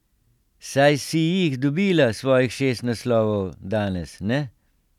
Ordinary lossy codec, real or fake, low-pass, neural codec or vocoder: none; real; 19.8 kHz; none